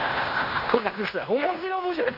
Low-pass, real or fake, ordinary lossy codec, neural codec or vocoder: 5.4 kHz; fake; none; codec, 16 kHz in and 24 kHz out, 0.9 kbps, LongCat-Audio-Codec, fine tuned four codebook decoder